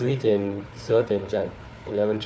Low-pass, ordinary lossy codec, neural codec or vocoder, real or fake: none; none; codec, 16 kHz, 4 kbps, FunCodec, trained on Chinese and English, 50 frames a second; fake